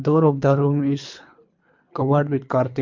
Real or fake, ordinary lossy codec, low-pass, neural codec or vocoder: fake; MP3, 64 kbps; 7.2 kHz; codec, 24 kHz, 3 kbps, HILCodec